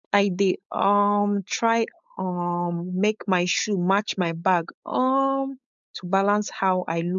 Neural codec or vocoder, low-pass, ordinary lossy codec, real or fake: codec, 16 kHz, 4.8 kbps, FACodec; 7.2 kHz; MP3, 64 kbps; fake